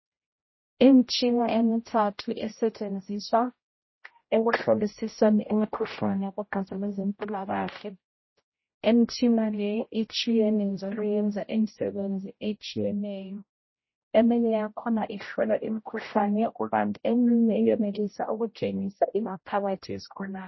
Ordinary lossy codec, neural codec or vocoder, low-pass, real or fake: MP3, 24 kbps; codec, 16 kHz, 0.5 kbps, X-Codec, HuBERT features, trained on general audio; 7.2 kHz; fake